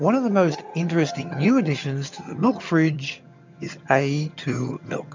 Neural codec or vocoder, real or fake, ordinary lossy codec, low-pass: vocoder, 22.05 kHz, 80 mel bands, HiFi-GAN; fake; MP3, 64 kbps; 7.2 kHz